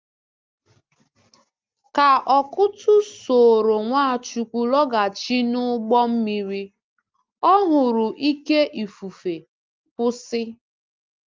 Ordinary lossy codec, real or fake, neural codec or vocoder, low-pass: Opus, 32 kbps; real; none; 7.2 kHz